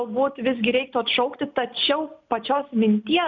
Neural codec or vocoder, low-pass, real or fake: none; 7.2 kHz; real